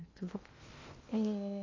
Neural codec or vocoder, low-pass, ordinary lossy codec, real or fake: codec, 16 kHz in and 24 kHz out, 0.8 kbps, FocalCodec, streaming, 65536 codes; 7.2 kHz; MP3, 32 kbps; fake